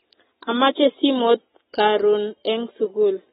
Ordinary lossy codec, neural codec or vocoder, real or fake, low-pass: AAC, 16 kbps; none; real; 19.8 kHz